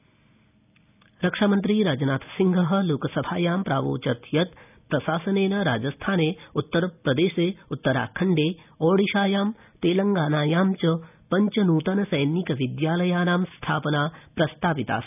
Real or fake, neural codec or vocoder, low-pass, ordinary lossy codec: real; none; 3.6 kHz; none